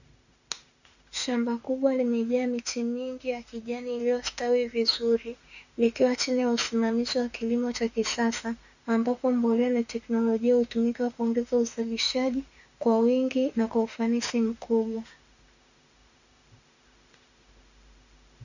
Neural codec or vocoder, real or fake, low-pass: autoencoder, 48 kHz, 32 numbers a frame, DAC-VAE, trained on Japanese speech; fake; 7.2 kHz